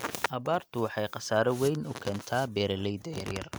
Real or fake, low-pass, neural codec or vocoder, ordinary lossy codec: real; none; none; none